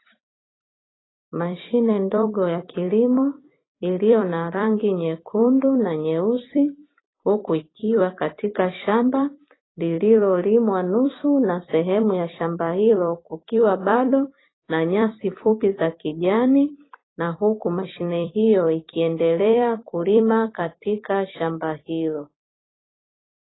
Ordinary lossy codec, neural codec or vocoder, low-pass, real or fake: AAC, 16 kbps; vocoder, 44.1 kHz, 128 mel bands every 256 samples, BigVGAN v2; 7.2 kHz; fake